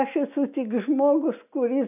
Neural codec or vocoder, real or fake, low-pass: none; real; 3.6 kHz